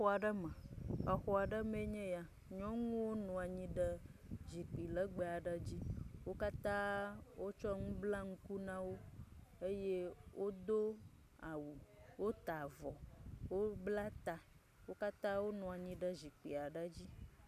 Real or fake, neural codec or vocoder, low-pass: real; none; 14.4 kHz